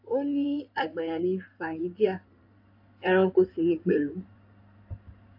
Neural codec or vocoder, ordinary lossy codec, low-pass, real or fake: codec, 16 kHz in and 24 kHz out, 2.2 kbps, FireRedTTS-2 codec; AAC, 48 kbps; 5.4 kHz; fake